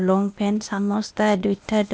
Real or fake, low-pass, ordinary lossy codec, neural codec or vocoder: fake; none; none; codec, 16 kHz, 0.8 kbps, ZipCodec